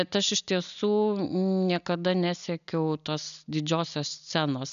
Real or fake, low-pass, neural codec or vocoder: real; 7.2 kHz; none